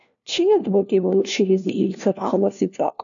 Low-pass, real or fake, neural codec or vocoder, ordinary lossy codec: 7.2 kHz; fake; codec, 16 kHz, 1 kbps, FunCodec, trained on LibriTTS, 50 frames a second; MP3, 96 kbps